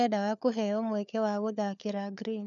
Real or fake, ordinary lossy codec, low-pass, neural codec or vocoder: fake; none; 7.2 kHz; codec, 16 kHz, 4 kbps, FunCodec, trained on LibriTTS, 50 frames a second